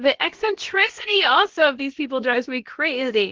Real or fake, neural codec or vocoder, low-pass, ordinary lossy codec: fake; codec, 16 kHz, about 1 kbps, DyCAST, with the encoder's durations; 7.2 kHz; Opus, 16 kbps